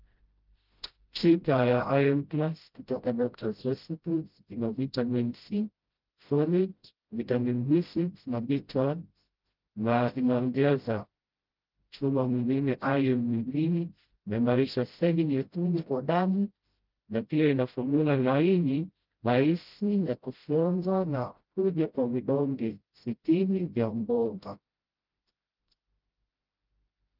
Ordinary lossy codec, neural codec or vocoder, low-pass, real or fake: Opus, 32 kbps; codec, 16 kHz, 0.5 kbps, FreqCodec, smaller model; 5.4 kHz; fake